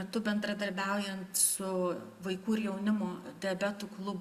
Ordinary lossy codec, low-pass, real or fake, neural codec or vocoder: Opus, 64 kbps; 14.4 kHz; real; none